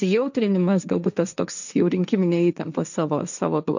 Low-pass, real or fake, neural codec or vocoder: 7.2 kHz; fake; codec, 16 kHz, 1.1 kbps, Voila-Tokenizer